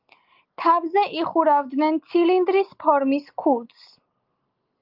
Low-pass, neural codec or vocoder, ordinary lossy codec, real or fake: 5.4 kHz; none; Opus, 32 kbps; real